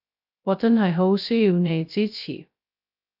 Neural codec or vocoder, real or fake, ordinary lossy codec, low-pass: codec, 16 kHz, 0.2 kbps, FocalCodec; fake; AAC, 48 kbps; 5.4 kHz